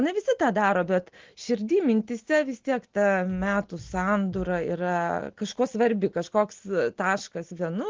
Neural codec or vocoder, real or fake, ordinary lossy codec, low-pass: none; real; Opus, 16 kbps; 7.2 kHz